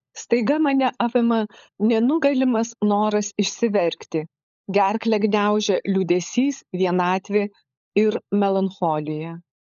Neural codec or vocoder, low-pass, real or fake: codec, 16 kHz, 16 kbps, FunCodec, trained on LibriTTS, 50 frames a second; 7.2 kHz; fake